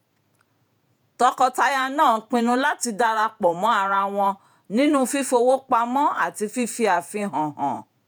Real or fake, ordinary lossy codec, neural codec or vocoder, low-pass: fake; none; vocoder, 48 kHz, 128 mel bands, Vocos; none